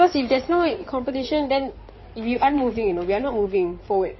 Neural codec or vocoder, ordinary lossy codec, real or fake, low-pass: codec, 16 kHz in and 24 kHz out, 2.2 kbps, FireRedTTS-2 codec; MP3, 24 kbps; fake; 7.2 kHz